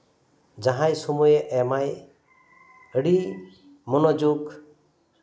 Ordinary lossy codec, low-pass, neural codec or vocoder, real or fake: none; none; none; real